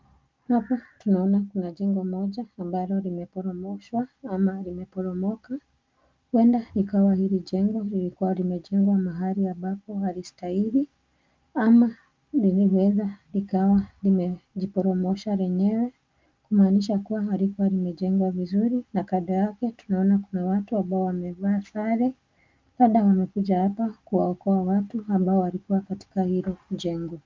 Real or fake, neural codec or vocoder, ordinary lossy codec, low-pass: real; none; Opus, 24 kbps; 7.2 kHz